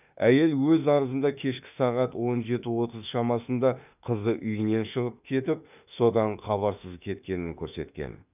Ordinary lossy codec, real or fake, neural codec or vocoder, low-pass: none; fake; autoencoder, 48 kHz, 32 numbers a frame, DAC-VAE, trained on Japanese speech; 3.6 kHz